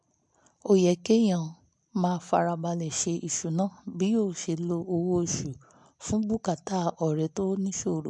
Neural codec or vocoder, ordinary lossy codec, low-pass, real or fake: none; MP3, 64 kbps; 10.8 kHz; real